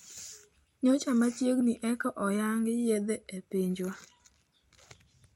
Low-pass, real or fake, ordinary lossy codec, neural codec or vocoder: 19.8 kHz; real; MP3, 64 kbps; none